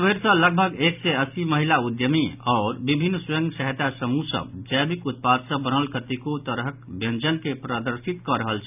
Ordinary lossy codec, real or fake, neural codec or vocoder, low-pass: none; real; none; 3.6 kHz